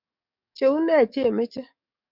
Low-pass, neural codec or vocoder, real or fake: 5.4 kHz; codec, 44.1 kHz, 7.8 kbps, DAC; fake